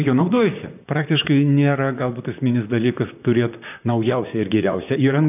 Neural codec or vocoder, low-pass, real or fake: vocoder, 44.1 kHz, 128 mel bands, Pupu-Vocoder; 3.6 kHz; fake